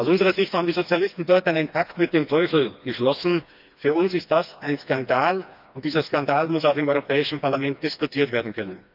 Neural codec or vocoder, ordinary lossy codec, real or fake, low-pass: codec, 16 kHz, 2 kbps, FreqCodec, smaller model; none; fake; 5.4 kHz